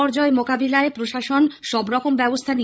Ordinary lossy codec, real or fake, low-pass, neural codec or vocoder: none; fake; none; codec, 16 kHz, 16 kbps, FreqCodec, larger model